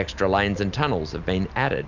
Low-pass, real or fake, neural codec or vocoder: 7.2 kHz; real; none